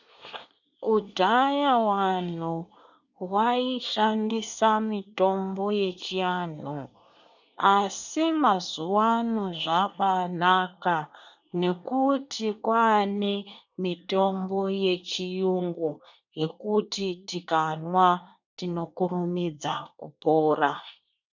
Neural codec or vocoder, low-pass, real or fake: codec, 24 kHz, 1 kbps, SNAC; 7.2 kHz; fake